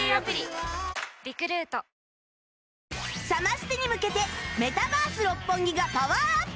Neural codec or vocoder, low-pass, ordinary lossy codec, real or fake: none; none; none; real